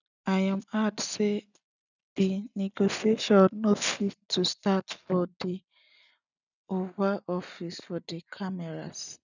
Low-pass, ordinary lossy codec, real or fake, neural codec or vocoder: 7.2 kHz; none; real; none